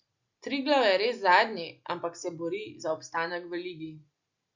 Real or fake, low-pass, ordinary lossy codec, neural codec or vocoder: real; 7.2 kHz; Opus, 64 kbps; none